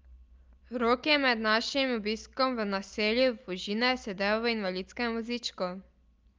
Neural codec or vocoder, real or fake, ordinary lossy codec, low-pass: none; real; Opus, 24 kbps; 7.2 kHz